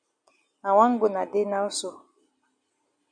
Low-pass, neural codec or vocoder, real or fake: 9.9 kHz; none; real